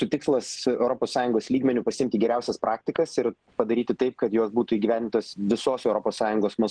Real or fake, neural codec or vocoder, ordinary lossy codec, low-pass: real; none; Opus, 16 kbps; 9.9 kHz